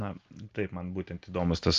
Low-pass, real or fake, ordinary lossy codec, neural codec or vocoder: 7.2 kHz; real; Opus, 24 kbps; none